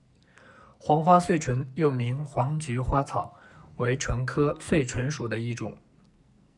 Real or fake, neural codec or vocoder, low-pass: fake; codec, 44.1 kHz, 2.6 kbps, SNAC; 10.8 kHz